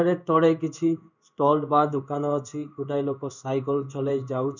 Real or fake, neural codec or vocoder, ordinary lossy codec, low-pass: fake; codec, 16 kHz in and 24 kHz out, 1 kbps, XY-Tokenizer; none; 7.2 kHz